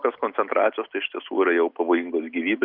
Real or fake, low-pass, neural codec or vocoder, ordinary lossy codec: real; 5.4 kHz; none; Opus, 64 kbps